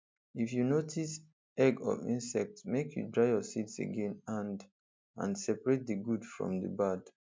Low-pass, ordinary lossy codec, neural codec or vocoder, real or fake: none; none; none; real